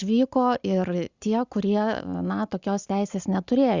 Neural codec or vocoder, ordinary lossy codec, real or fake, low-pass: none; Opus, 64 kbps; real; 7.2 kHz